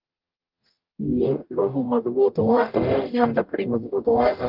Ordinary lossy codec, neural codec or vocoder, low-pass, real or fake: Opus, 32 kbps; codec, 44.1 kHz, 0.9 kbps, DAC; 5.4 kHz; fake